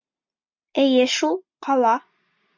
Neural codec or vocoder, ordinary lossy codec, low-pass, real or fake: none; MP3, 64 kbps; 7.2 kHz; real